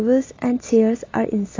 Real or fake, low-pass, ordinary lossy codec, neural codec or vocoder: real; 7.2 kHz; AAC, 32 kbps; none